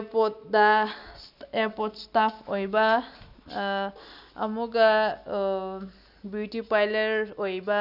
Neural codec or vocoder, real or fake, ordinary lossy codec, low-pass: none; real; none; 5.4 kHz